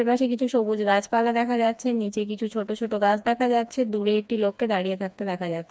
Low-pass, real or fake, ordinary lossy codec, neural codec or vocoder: none; fake; none; codec, 16 kHz, 2 kbps, FreqCodec, smaller model